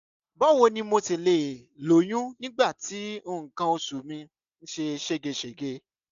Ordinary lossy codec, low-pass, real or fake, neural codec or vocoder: AAC, 96 kbps; 7.2 kHz; real; none